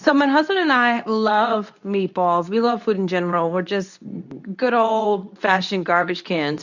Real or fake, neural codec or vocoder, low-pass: fake; codec, 24 kHz, 0.9 kbps, WavTokenizer, medium speech release version 2; 7.2 kHz